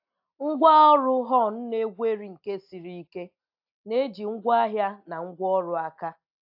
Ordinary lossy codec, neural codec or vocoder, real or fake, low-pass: AAC, 48 kbps; none; real; 5.4 kHz